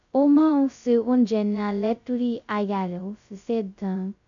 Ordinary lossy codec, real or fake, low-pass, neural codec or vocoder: MP3, 96 kbps; fake; 7.2 kHz; codec, 16 kHz, 0.2 kbps, FocalCodec